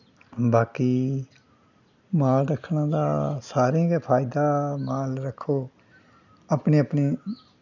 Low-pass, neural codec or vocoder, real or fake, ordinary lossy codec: 7.2 kHz; none; real; none